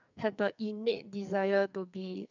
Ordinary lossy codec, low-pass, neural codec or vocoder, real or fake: none; 7.2 kHz; codec, 44.1 kHz, 2.6 kbps, SNAC; fake